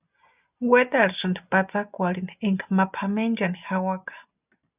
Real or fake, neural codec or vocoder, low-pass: real; none; 3.6 kHz